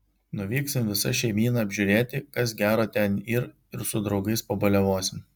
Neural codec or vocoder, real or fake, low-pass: none; real; 19.8 kHz